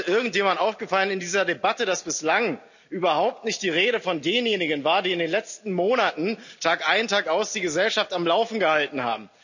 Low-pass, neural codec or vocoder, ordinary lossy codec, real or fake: 7.2 kHz; none; none; real